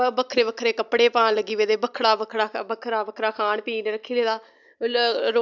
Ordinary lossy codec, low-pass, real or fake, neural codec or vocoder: none; 7.2 kHz; real; none